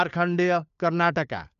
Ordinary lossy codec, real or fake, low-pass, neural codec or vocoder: none; fake; 7.2 kHz; codec, 16 kHz, 4 kbps, FunCodec, trained on LibriTTS, 50 frames a second